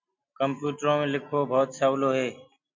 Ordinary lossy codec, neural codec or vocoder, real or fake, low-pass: MP3, 64 kbps; none; real; 7.2 kHz